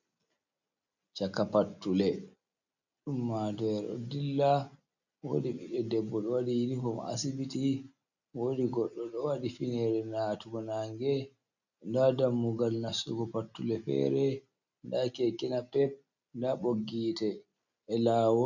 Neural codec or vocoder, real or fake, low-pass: none; real; 7.2 kHz